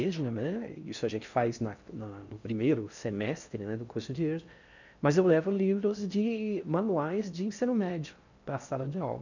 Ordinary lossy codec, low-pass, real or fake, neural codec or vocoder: none; 7.2 kHz; fake; codec, 16 kHz in and 24 kHz out, 0.6 kbps, FocalCodec, streaming, 4096 codes